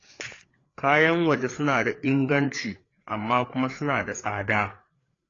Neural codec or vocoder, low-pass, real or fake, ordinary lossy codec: codec, 16 kHz, 4 kbps, FreqCodec, larger model; 7.2 kHz; fake; AAC, 32 kbps